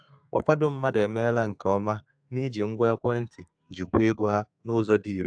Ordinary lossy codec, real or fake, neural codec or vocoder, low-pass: AAC, 64 kbps; fake; codec, 32 kHz, 1.9 kbps, SNAC; 9.9 kHz